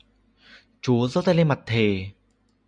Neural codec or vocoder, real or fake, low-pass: none; real; 9.9 kHz